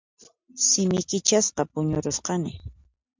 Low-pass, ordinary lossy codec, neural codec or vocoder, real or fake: 7.2 kHz; MP3, 64 kbps; none; real